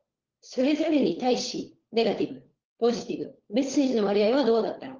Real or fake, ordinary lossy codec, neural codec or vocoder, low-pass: fake; Opus, 16 kbps; codec, 16 kHz, 4 kbps, FunCodec, trained on LibriTTS, 50 frames a second; 7.2 kHz